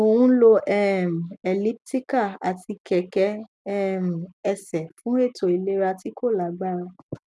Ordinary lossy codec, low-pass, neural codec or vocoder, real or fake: none; none; none; real